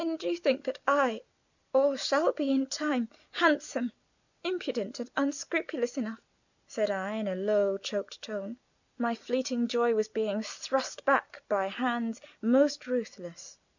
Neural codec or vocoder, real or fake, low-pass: none; real; 7.2 kHz